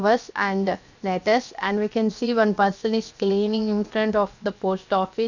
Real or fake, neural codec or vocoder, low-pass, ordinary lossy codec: fake; codec, 16 kHz, 0.7 kbps, FocalCodec; 7.2 kHz; none